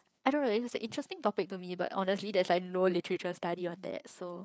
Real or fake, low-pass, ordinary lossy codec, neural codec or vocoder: fake; none; none; codec, 16 kHz, 4 kbps, FreqCodec, larger model